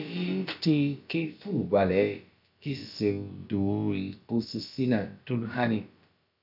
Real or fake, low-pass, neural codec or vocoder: fake; 5.4 kHz; codec, 16 kHz, about 1 kbps, DyCAST, with the encoder's durations